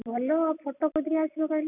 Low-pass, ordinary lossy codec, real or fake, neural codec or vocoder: 3.6 kHz; none; real; none